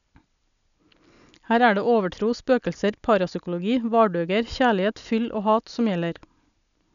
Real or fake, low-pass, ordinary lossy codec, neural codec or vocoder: real; 7.2 kHz; none; none